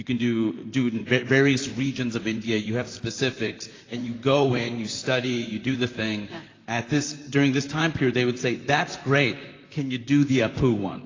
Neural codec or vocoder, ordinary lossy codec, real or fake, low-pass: vocoder, 44.1 kHz, 128 mel bands, Pupu-Vocoder; AAC, 32 kbps; fake; 7.2 kHz